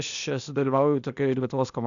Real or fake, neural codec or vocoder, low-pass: fake; codec, 16 kHz, 0.8 kbps, ZipCodec; 7.2 kHz